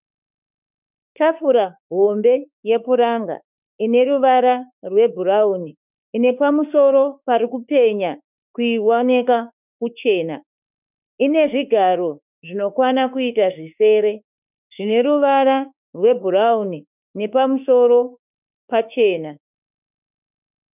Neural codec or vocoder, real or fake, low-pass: autoencoder, 48 kHz, 32 numbers a frame, DAC-VAE, trained on Japanese speech; fake; 3.6 kHz